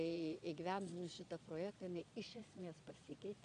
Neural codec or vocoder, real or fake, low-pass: vocoder, 22.05 kHz, 80 mel bands, Vocos; fake; 9.9 kHz